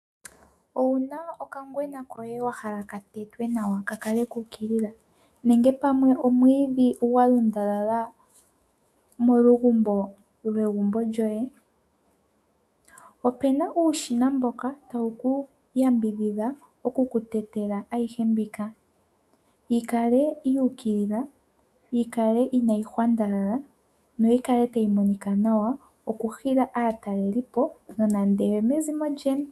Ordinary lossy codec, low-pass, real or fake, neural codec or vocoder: AAC, 96 kbps; 14.4 kHz; fake; autoencoder, 48 kHz, 128 numbers a frame, DAC-VAE, trained on Japanese speech